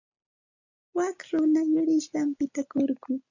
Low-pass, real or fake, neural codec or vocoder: 7.2 kHz; real; none